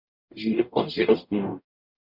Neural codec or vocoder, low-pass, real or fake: codec, 44.1 kHz, 0.9 kbps, DAC; 5.4 kHz; fake